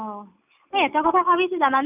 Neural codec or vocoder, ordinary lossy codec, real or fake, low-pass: none; none; real; 3.6 kHz